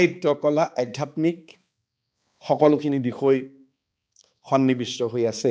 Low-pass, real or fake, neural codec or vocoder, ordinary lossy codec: none; fake; codec, 16 kHz, 2 kbps, X-Codec, HuBERT features, trained on balanced general audio; none